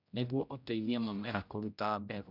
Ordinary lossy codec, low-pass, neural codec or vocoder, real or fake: none; 5.4 kHz; codec, 16 kHz, 0.5 kbps, X-Codec, HuBERT features, trained on general audio; fake